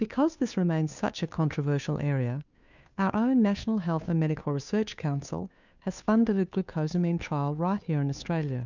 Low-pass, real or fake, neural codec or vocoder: 7.2 kHz; fake; codec, 16 kHz, 2 kbps, FunCodec, trained on Chinese and English, 25 frames a second